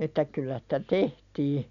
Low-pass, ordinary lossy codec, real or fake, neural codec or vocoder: 7.2 kHz; none; real; none